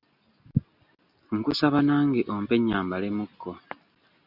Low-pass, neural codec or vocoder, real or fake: 5.4 kHz; none; real